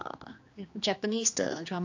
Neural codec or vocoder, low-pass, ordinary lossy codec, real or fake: codec, 16 kHz, 2 kbps, X-Codec, HuBERT features, trained on general audio; 7.2 kHz; AAC, 48 kbps; fake